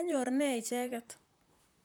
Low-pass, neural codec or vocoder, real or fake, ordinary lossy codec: none; vocoder, 44.1 kHz, 128 mel bands, Pupu-Vocoder; fake; none